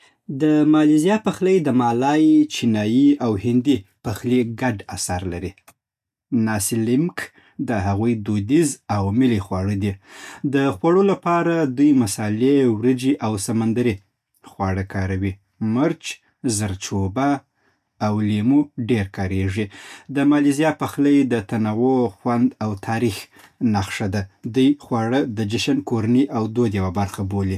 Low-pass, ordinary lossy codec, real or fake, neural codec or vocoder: 14.4 kHz; none; real; none